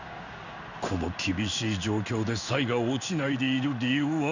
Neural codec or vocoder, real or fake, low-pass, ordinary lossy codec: codec, 16 kHz in and 24 kHz out, 1 kbps, XY-Tokenizer; fake; 7.2 kHz; none